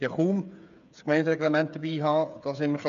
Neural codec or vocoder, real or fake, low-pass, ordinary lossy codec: codec, 16 kHz, 8 kbps, FreqCodec, smaller model; fake; 7.2 kHz; none